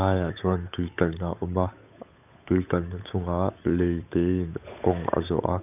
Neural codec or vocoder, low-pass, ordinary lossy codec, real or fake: codec, 16 kHz, 8 kbps, FunCodec, trained on Chinese and English, 25 frames a second; 3.6 kHz; none; fake